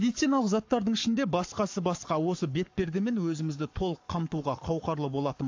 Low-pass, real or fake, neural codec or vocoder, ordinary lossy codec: 7.2 kHz; fake; codec, 44.1 kHz, 7.8 kbps, Pupu-Codec; AAC, 48 kbps